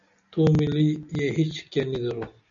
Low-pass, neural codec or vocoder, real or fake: 7.2 kHz; none; real